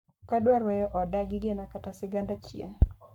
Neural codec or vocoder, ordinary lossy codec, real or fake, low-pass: codec, 44.1 kHz, 7.8 kbps, Pupu-Codec; none; fake; 19.8 kHz